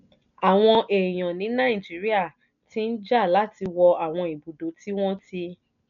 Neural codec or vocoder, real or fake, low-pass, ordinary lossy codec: none; real; 7.2 kHz; none